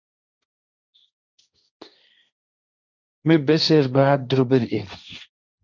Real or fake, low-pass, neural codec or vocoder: fake; 7.2 kHz; codec, 16 kHz, 1.1 kbps, Voila-Tokenizer